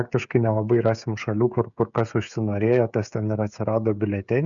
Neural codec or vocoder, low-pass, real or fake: codec, 16 kHz, 16 kbps, FreqCodec, smaller model; 7.2 kHz; fake